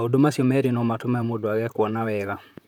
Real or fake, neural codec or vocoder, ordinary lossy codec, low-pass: fake; vocoder, 44.1 kHz, 128 mel bands, Pupu-Vocoder; none; 19.8 kHz